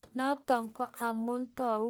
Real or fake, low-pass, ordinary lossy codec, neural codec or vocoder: fake; none; none; codec, 44.1 kHz, 1.7 kbps, Pupu-Codec